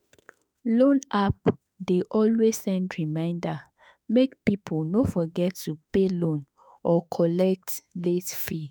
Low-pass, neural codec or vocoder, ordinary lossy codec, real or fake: none; autoencoder, 48 kHz, 32 numbers a frame, DAC-VAE, trained on Japanese speech; none; fake